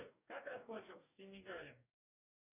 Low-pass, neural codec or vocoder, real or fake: 3.6 kHz; codec, 44.1 kHz, 2.6 kbps, DAC; fake